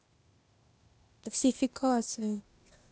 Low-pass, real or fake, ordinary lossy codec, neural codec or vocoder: none; fake; none; codec, 16 kHz, 0.8 kbps, ZipCodec